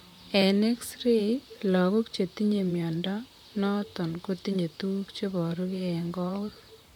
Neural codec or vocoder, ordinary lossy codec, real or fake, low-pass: vocoder, 44.1 kHz, 128 mel bands, Pupu-Vocoder; none; fake; 19.8 kHz